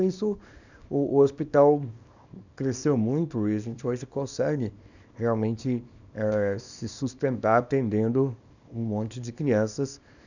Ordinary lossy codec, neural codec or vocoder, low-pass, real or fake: none; codec, 24 kHz, 0.9 kbps, WavTokenizer, small release; 7.2 kHz; fake